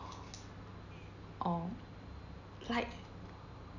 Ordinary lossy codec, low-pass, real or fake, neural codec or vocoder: none; 7.2 kHz; real; none